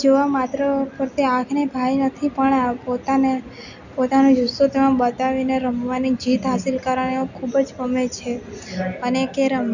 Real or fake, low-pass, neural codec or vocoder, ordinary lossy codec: real; 7.2 kHz; none; none